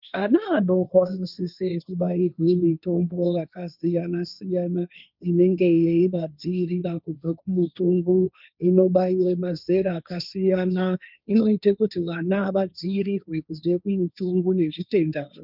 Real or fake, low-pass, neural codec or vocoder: fake; 5.4 kHz; codec, 16 kHz, 1.1 kbps, Voila-Tokenizer